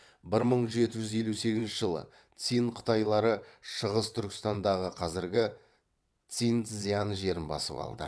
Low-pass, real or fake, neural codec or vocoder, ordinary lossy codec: none; fake; vocoder, 22.05 kHz, 80 mel bands, WaveNeXt; none